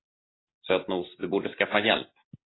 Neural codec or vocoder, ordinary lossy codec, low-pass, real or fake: none; AAC, 16 kbps; 7.2 kHz; real